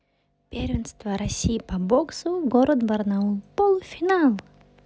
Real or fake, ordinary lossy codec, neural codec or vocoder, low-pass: real; none; none; none